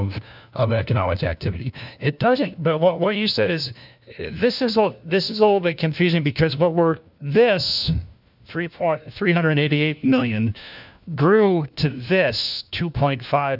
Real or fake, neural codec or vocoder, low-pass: fake; codec, 16 kHz, 1 kbps, FunCodec, trained on LibriTTS, 50 frames a second; 5.4 kHz